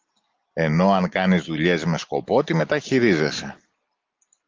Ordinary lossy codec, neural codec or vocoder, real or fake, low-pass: Opus, 32 kbps; none; real; 7.2 kHz